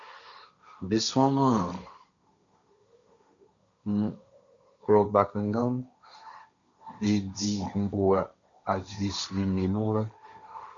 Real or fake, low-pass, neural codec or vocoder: fake; 7.2 kHz; codec, 16 kHz, 1.1 kbps, Voila-Tokenizer